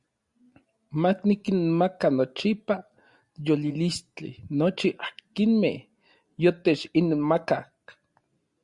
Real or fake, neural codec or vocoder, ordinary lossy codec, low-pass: real; none; Opus, 64 kbps; 10.8 kHz